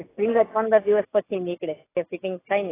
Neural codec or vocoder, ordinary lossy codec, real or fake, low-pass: none; AAC, 16 kbps; real; 3.6 kHz